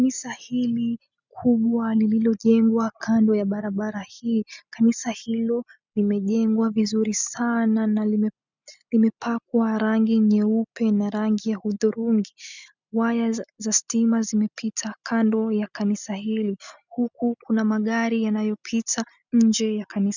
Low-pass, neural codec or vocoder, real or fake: 7.2 kHz; none; real